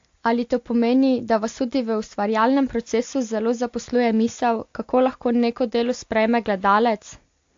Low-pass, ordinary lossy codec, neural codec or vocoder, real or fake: 7.2 kHz; AAC, 48 kbps; none; real